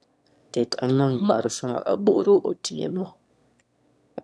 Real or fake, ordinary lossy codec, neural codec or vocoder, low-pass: fake; none; autoencoder, 22.05 kHz, a latent of 192 numbers a frame, VITS, trained on one speaker; none